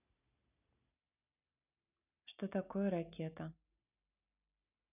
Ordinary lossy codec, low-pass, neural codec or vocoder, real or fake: none; 3.6 kHz; none; real